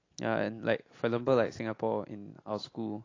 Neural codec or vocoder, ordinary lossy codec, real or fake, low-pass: none; AAC, 32 kbps; real; 7.2 kHz